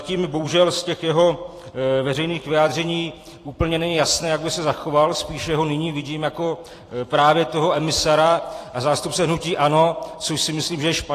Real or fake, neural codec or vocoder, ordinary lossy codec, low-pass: fake; vocoder, 44.1 kHz, 128 mel bands every 256 samples, BigVGAN v2; AAC, 48 kbps; 14.4 kHz